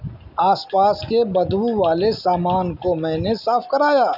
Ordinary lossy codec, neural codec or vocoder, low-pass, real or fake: none; none; 5.4 kHz; real